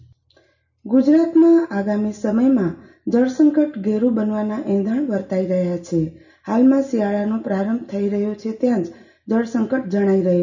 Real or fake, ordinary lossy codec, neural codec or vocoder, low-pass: real; none; none; 7.2 kHz